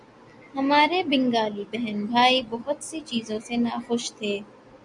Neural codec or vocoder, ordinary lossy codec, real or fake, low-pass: none; MP3, 96 kbps; real; 10.8 kHz